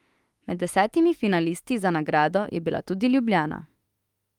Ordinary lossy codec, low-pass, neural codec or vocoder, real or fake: Opus, 32 kbps; 19.8 kHz; autoencoder, 48 kHz, 32 numbers a frame, DAC-VAE, trained on Japanese speech; fake